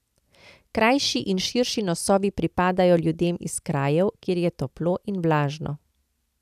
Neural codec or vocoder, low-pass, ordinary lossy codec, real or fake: none; 14.4 kHz; none; real